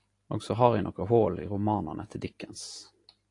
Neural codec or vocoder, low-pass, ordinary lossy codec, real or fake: none; 10.8 kHz; AAC, 48 kbps; real